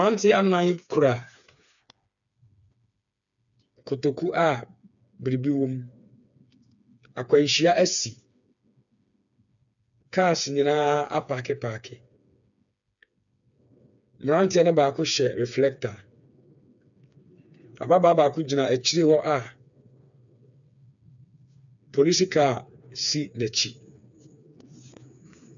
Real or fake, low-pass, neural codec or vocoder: fake; 7.2 kHz; codec, 16 kHz, 4 kbps, FreqCodec, smaller model